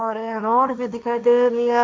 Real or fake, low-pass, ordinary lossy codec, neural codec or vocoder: fake; none; none; codec, 16 kHz, 1.1 kbps, Voila-Tokenizer